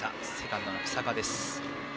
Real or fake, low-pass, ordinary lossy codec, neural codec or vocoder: real; none; none; none